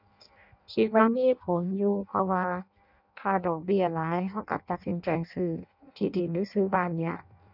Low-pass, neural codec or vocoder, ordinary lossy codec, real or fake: 5.4 kHz; codec, 16 kHz in and 24 kHz out, 0.6 kbps, FireRedTTS-2 codec; none; fake